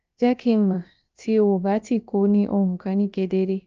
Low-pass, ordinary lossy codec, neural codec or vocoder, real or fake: 7.2 kHz; Opus, 24 kbps; codec, 16 kHz, about 1 kbps, DyCAST, with the encoder's durations; fake